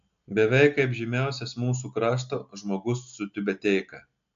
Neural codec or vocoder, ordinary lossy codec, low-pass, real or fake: none; AAC, 64 kbps; 7.2 kHz; real